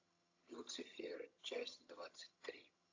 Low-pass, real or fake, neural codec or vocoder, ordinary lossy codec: 7.2 kHz; fake; vocoder, 22.05 kHz, 80 mel bands, HiFi-GAN; AAC, 48 kbps